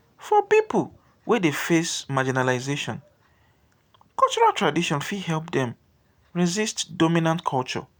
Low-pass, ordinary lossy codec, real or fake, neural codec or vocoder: none; none; real; none